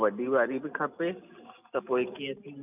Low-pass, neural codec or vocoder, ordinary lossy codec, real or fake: 3.6 kHz; none; none; real